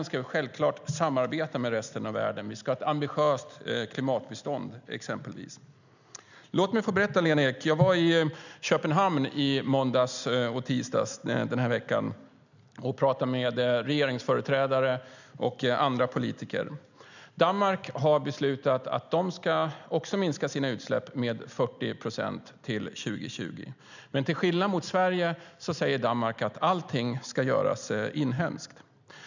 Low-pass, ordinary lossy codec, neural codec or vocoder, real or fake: 7.2 kHz; none; none; real